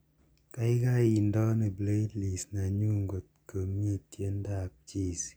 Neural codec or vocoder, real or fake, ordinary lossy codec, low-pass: none; real; none; none